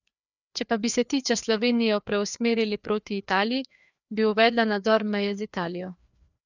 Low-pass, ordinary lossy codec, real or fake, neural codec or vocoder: 7.2 kHz; none; fake; codec, 16 kHz, 2 kbps, FreqCodec, larger model